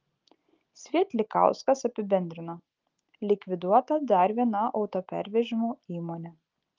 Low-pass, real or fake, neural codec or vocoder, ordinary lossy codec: 7.2 kHz; real; none; Opus, 32 kbps